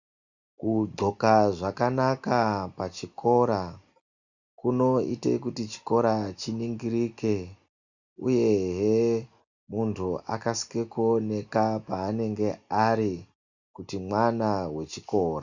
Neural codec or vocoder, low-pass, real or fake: none; 7.2 kHz; real